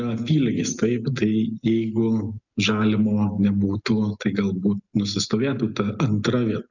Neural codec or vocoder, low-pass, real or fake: none; 7.2 kHz; real